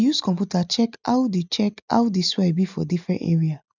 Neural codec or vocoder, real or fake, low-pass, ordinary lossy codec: none; real; 7.2 kHz; none